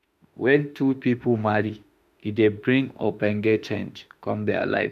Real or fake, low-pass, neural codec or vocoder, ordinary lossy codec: fake; 14.4 kHz; autoencoder, 48 kHz, 32 numbers a frame, DAC-VAE, trained on Japanese speech; none